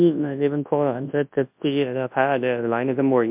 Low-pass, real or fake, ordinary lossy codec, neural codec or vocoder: 3.6 kHz; fake; MP3, 32 kbps; codec, 24 kHz, 0.9 kbps, WavTokenizer, large speech release